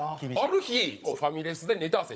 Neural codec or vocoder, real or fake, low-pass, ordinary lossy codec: codec, 16 kHz, 16 kbps, FunCodec, trained on Chinese and English, 50 frames a second; fake; none; none